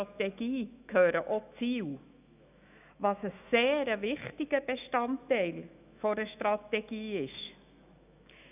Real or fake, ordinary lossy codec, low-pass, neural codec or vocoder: fake; none; 3.6 kHz; autoencoder, 48 kHz, 128 numbers a frame, DAC-VAE, trained on Japanese speech